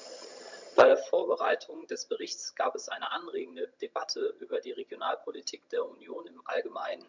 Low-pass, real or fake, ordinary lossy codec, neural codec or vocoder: 7.2 kHz; fake; MP3, 64 kbps; vocoder, 22.05 kHz, 80 mel bands, HiFi-GAN